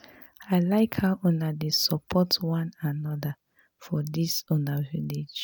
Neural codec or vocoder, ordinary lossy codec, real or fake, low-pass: none; none; real; none